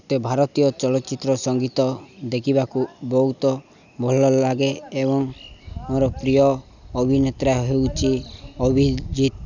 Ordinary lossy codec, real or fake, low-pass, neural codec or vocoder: none; real; 7.2 kHz; none